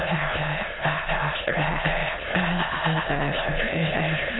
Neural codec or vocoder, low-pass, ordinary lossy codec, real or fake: autoencoder, 22.05 kHz, a latent of 192 numbers a frame, VITS, trained on many speakers; 7.2 kHz; AAC, 16 kbps; fake